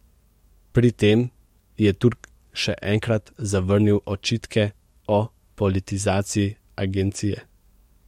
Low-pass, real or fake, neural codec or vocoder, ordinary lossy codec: 19.8 kHz; fake; codec, 44.1 kHz, 7.8 kbps, DAC; MP3, 64 kbps